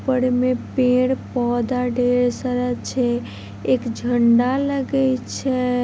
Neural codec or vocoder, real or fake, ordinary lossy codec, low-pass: none; real; none; none